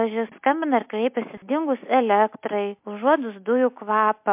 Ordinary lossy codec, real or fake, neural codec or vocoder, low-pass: MP3, 32 kbps; real; none; 3.6 kHz